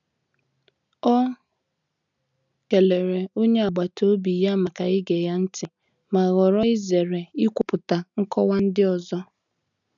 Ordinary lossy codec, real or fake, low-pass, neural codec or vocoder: none; real; 7.2 kHz; none